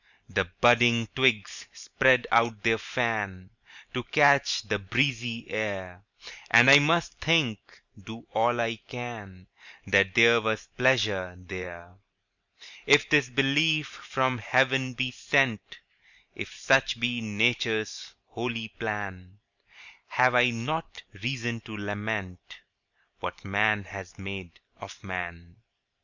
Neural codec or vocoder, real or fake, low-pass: vocoder, 44.1 kHz, 128 mel bands every 512 samples, BigVGAN v2; fake; 7.2 kHz